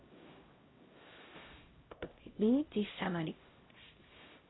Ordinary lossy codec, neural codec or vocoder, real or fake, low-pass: AAC, 16 kbps; codec, 16 kHz, 0.5 kbps, X-Codec, HuBERT features, trained on LibriSpeech; fake; 7.2 kHz